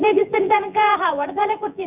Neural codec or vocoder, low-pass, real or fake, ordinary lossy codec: vocoder, 24 kHz, 100 mel bands, Vocos; 3.6 kHz; fake; none